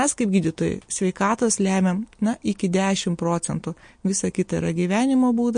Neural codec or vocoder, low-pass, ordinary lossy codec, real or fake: none; 9.9 kHz; MP3, 48 kbps; real